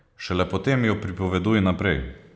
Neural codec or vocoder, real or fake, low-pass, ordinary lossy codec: none; real; none; none